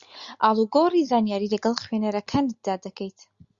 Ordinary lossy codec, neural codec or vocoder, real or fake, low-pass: Opus, 64 kbps; none; real; 7.2 kHz